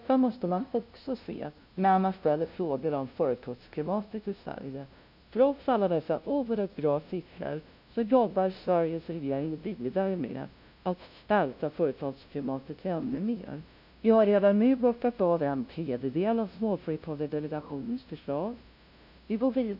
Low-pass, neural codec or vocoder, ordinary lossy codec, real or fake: 5.4 kHz; codec, 16 kHz, 0.5 kbps, FunCodec, trained on LibriTTS, 25 frames a second; none; fake